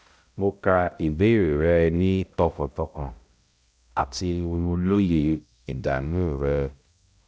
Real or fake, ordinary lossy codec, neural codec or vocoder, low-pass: fake; none; codec, 16 kHz, 0.5 kbps, X-Codec, HuBERT features, trained on balanced general audio; none